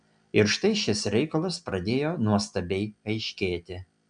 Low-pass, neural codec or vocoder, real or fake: 9.9 kHz; none; real